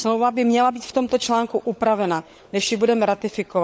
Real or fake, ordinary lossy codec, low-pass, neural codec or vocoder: fake; none; none; codec, 16 kHz, 16 kbps, FunCodec, trained on LibriTTS, 50 frames a second